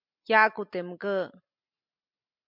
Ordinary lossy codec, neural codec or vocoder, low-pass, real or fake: MP3, 48 kbps; none; 5.4 kHz; real